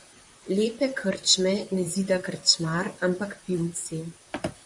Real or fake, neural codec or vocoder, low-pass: fake; vocoder, 44.1 kHz, 128 mel bands, Pupu-Vocoder; 10.8 kHz